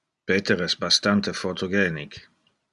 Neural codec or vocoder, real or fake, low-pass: none; real; 10.8 kHz